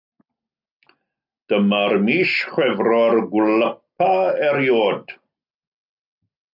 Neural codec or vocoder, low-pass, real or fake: none; 5.4 kHz; real